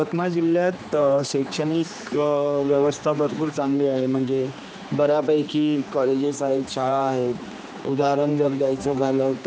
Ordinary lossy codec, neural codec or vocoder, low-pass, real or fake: none; codec, 16 kHz, 2 kbps, X-Codec, HuBERT features, trained on general audio; none; fake